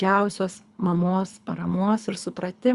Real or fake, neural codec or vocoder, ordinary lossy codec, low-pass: fake; codec, 24 kHz, 3 kbps, HILCodec; Opus, 64 kbps; 10.8 kHz